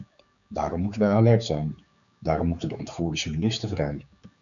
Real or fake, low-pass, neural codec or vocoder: fake; 7.2 kHz; codec, 16 kHz, 4 kbps, X-Codec, HuBERT features, trained on general audio